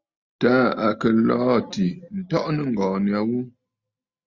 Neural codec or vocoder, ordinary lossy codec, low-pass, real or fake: none; Opus, 64 kbps; 7.2 kHz; real